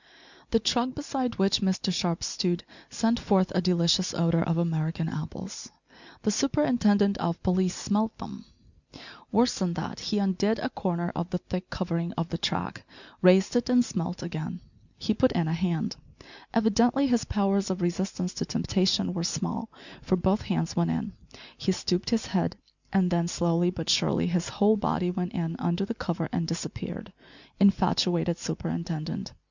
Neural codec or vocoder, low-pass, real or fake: none; 7.2 kHz; real